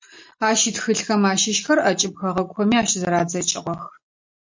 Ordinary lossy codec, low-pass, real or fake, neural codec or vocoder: MP3, 48 kbps; 7.2 kHz; real; none